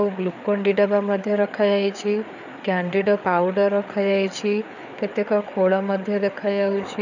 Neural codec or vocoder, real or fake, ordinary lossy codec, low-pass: codec, 16 kHz, 4 kbps, FreqCodec, larger model; fake; none; 7.2 kHz